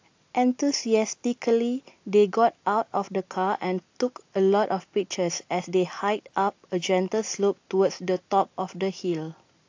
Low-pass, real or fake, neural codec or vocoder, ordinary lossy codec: 7.2 kHz; real; none; AAC, 48 kbps